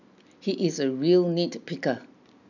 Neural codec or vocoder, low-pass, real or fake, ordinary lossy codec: none; 7.2 kHz; real; none